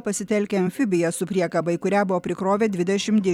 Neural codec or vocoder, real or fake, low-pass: vocoder, 44.1 kHz, 128 mel bands every 256 samples, BigVGAN v2; fake; 19.8 kHz